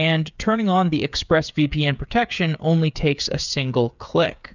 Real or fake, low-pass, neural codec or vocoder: fake; 7.2 kHz; codec, 16 kHz, 8 kbps, FreqCodec, smaller model